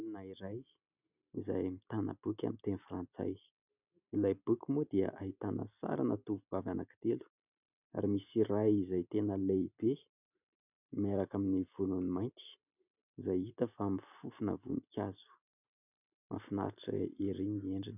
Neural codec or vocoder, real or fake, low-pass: none; real; 3.6 kHz